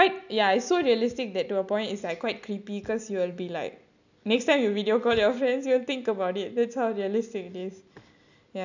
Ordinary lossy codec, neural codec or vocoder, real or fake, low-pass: none; none; real; 7.2 kHz